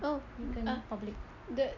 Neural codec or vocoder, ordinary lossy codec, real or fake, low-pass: none; none; real; 7.2 kHz